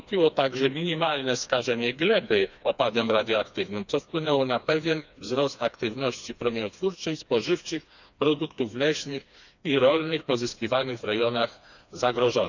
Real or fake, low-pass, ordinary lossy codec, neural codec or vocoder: fake; 7.2 kHz; none; codec, 16 kHz, 2 kbps, FreqCodec, smaller model